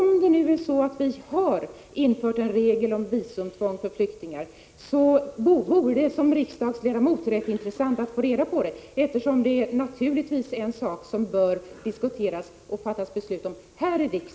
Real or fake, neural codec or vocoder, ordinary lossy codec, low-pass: real; none; none; none